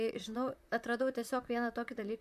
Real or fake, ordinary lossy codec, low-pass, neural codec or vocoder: fake; AAC, 96 kbps; 14.4 kHz; vocoder, 44.1 kHz, 128 mel bands, Pupu-Vocoder